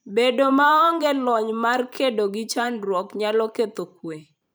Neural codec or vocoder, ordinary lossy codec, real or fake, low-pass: vocoder, 44.1 kHz, 128 mel bands every 512 samples, BigVGAN v2; none; fake; none